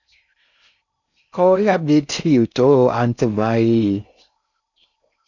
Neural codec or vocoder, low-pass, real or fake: codec, 16 kHz in and 24 kHz out, 0.6 kbps, FocalCodec, streaming, 4096 codes; 7.2 kHz; fake